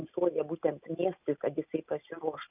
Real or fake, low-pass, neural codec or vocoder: real; 3.6 kHz; none